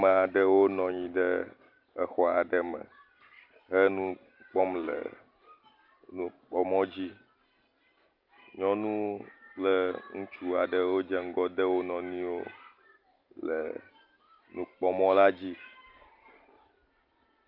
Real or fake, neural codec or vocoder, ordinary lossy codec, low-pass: real; none; Opus, 24 kbps; 5.4 kHz